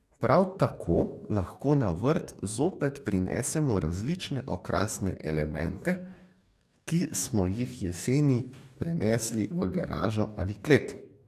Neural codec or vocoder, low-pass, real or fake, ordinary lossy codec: codec, 44.1 kHz, 2.6 kbps, DAC; 14.4 kHz; fake; none